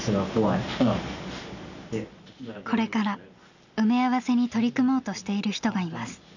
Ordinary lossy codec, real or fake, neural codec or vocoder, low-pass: none; real; none; 7.2 kHz